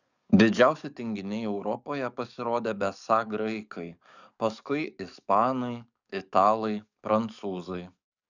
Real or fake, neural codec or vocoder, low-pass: fake; codec, 44.1 kHz, 7.8 kbps, DAC; 7.2 kHz